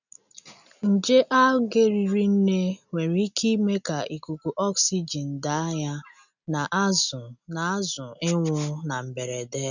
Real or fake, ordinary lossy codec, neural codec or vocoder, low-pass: real; none; none; 7.2 kHz